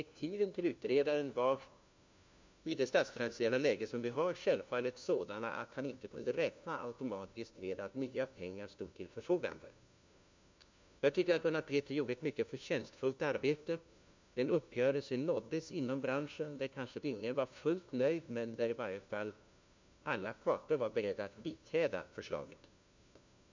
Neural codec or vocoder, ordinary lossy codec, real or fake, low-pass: codec, 16 kHz, 1 kbps, FunCodec, trained on LibriTTS, 50 frames a second; MP3, 64 kbps; fake; 7.2 kHz